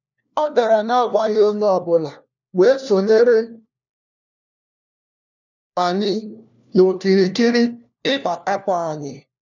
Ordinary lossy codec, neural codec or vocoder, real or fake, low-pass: none; codec, 16 kHz, 1 kbps, FunCodec, trained on LibriTTS, 50 frames a second; fake; 7.2 kHz